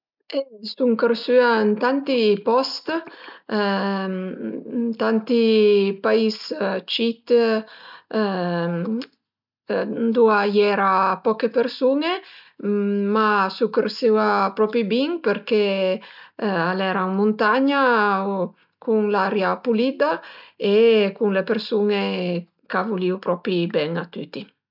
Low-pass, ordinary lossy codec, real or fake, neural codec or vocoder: 5.4 kHz; none; real; none